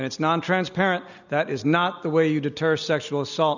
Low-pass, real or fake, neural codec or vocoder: 7.2 kHz; real; none